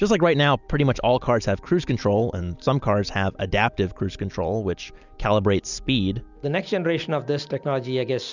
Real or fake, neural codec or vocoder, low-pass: real; none; 7.2 kHz